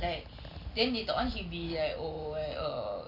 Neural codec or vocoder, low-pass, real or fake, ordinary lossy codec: none; 5.4 kHz; real; none